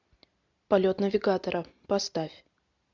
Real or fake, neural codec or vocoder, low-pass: real; none; 7.2 kHz